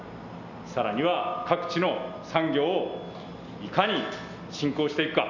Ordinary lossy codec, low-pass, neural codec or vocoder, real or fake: none; 7.2 kHz; none; real